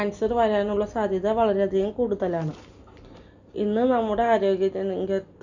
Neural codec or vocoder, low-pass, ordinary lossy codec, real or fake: none; 7.2 kHz; none; real